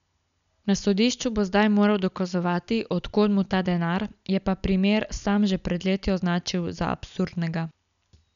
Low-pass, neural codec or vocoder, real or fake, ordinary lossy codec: 7.2 kHz; none; real; none